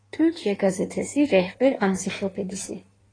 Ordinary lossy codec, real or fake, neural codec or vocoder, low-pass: AAC, 32 kbps; fake; codec, 16 kHz in and 24 kHz out, 1.1 kbps, FireRedTTS-2 codec; 9.9 kHz